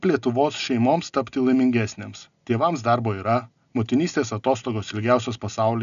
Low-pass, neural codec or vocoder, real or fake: 7.2 kHz; none; real